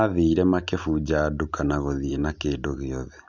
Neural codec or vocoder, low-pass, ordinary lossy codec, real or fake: none; 7.2 kHz; Opus, 64 kbps; real